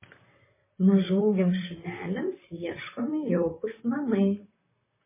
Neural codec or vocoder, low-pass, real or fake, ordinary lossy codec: vocoder, 44.1 kHz, 128 mel bands, Pupu-Vocoder; 3.6 kHz; fake; MP3, 16 kbps